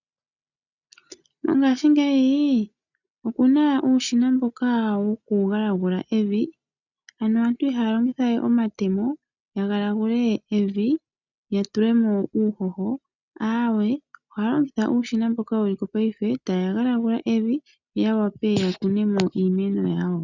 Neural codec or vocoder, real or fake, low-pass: none; real; 7.2 kHz